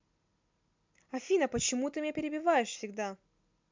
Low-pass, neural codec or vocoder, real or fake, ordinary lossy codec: 7.2 kHz; none; real; MP3, 64 kbps